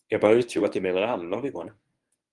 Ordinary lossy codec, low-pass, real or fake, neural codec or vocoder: Opus, 32 kbps; 10.8 kHz; fake; codec, 24 kHz, 0.9 kbps, WavTokenizer, medium speech release version 1